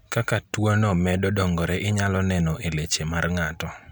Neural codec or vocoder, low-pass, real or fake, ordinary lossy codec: none; none; real; none